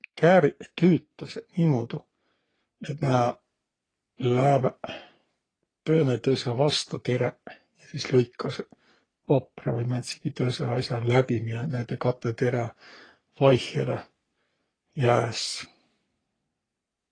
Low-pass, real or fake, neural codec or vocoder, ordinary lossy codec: 9.9 kHz; fake; codec, 44.1 kHz, 3.4 kbps, Pupu-Codec; AAC, 32 kbps